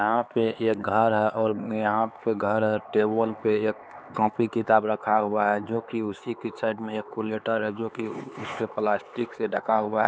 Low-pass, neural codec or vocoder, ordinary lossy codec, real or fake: none; codec, 16 kHz, 4 kbps, X-Codec, HuBERT features, trained on LibriSpeech; none; fake